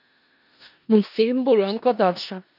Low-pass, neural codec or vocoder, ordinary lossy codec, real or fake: 5.4 kHz; codec, 16 kHz in and 24 kHz out, 0.4 kbps, LongCat-Audio-Codec, four codebook decoder; none; fake